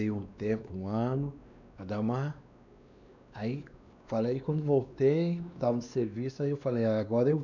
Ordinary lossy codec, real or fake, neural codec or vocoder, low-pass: none; fake; codec, 16 kHz, 2 kbps, X-Codec, WavLM features, trained on Multilingual LibriSpeech; 7.2 kHz